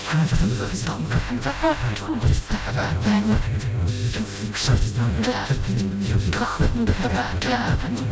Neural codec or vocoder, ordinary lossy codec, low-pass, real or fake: codec, 16 kHz, 0.5 kbps, FreqCodec, smaller model; none; none; fake